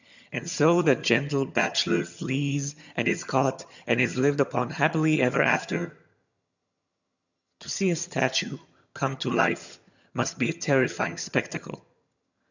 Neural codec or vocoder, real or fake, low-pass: vocoder, 22.05 kHz, 80 mel bands, HiFi-GAN; fake; 7.2 kHz